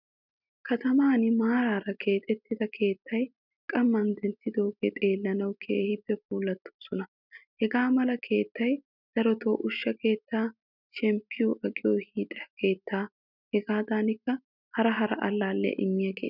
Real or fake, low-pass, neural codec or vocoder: real; 5.4 kHz; none